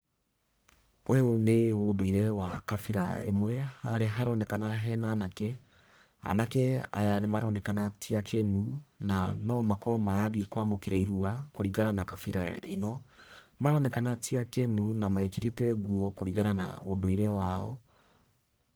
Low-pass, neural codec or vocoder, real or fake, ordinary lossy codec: none; codec, 44.1 kHz, 1.7 kbps, Pupu-Codec; fake; none